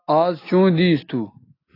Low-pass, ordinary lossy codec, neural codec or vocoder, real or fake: 5.4 kHz; AAC, 24 kbps; none; real